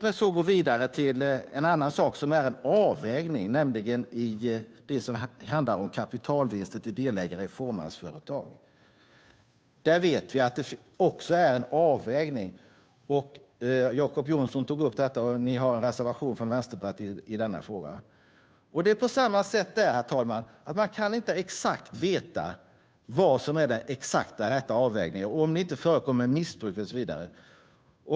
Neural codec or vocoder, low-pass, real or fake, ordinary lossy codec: codec, 16 kHz, 2 kbps, FunCodec, trained on Chinese and English, 25 frames a second; none; fake; none